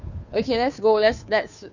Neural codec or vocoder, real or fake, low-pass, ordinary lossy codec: codec, 16 kHz, 2 kbps, FunCodec, trained on Chinese and English, 25 frames a second; fake; 7.2 kHz; none